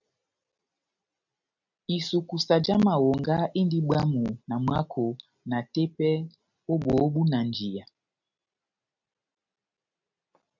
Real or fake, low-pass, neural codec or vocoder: real; 7.2 kHz; none